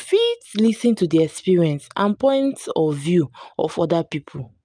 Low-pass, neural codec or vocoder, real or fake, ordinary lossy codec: 9.9 kHz; none; real; none